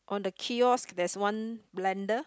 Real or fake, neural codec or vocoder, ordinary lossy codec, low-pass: real; none; none; none